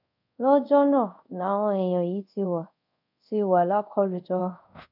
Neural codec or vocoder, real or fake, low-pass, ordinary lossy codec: codec, 24 kHz, 0.5 kbps, DualCodec; fake; 5.4 kHz; none